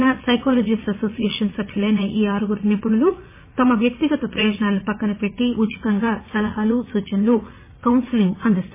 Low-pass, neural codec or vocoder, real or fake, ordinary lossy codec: 3.6 kHz; vocoder, 44.1 kHz, 80 mel bands, Vocos; fake; MP3, 16 kbps